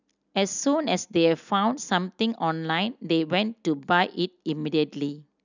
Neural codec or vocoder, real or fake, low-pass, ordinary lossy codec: none; real; 7.2 kHz; none